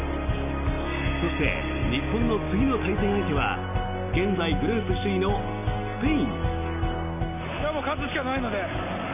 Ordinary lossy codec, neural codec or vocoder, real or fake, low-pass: none; none; real; 3.6 kHz